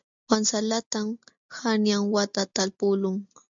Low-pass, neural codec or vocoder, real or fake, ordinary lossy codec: 7.2 kHz; none; real; MP3, 64 kbps